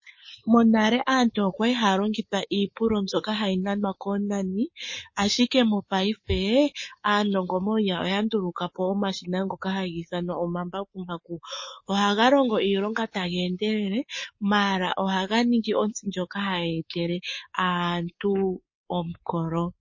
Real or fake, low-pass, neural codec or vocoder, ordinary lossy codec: fake; 7.2 kHz; codec, 24 kHz, 3.1 kbps, DualCodec; MP3, 32 kbps